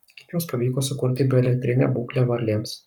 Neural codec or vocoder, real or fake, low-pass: codec, 44.1 kHz, 7.8 kbps, Pupu-Codec; fake; 19.8 kHz